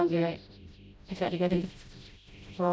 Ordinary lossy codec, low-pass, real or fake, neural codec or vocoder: none; none; fake; codec, 16 kHz, 0.5 kbps, FreqCodec, smaller model